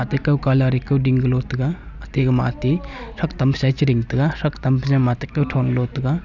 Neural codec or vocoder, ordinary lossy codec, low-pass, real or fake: none; none; 7.2 kHz; real